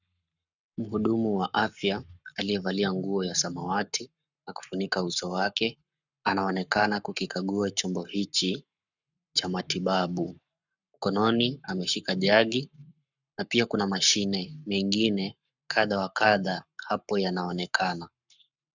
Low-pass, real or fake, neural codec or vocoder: 7.2 kHz; fake; codec, 44.1 kHz, 7.8 kbps, Pupu-Codec